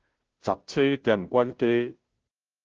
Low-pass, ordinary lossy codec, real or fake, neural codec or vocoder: 7.2 kHz; Opus, 16 kbps; fake; codec, 16 kHz, 0.5 kbps, FunCodec, trained on Chinese and English, 25 frames a second